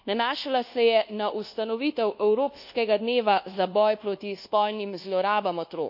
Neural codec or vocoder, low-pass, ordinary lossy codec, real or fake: codec, 24 kHz, 1.2 kbps, DualCodec; 5.4 kHz; none; fake